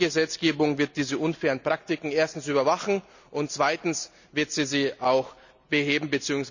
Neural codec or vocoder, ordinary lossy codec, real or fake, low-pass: none; none; real; 7.2 kHz